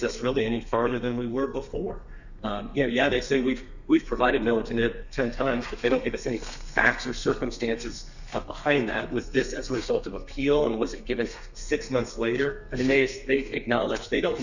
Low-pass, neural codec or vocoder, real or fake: 7.2 kHz; codec, 32 kHz, 1.9 kbps, SNAC; fake